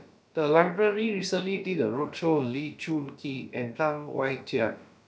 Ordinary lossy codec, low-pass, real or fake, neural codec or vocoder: none; none; fake; codec, 16 kHz, about 1 kbps, DyCAST, with the encoder's durations